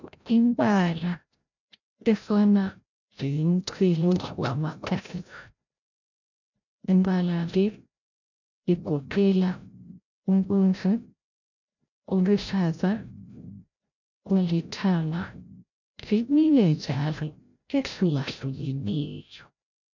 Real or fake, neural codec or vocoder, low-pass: fake; codec, 16 kHz, 0.5 kbps, FreqCodec, larger model; 7.2 kHz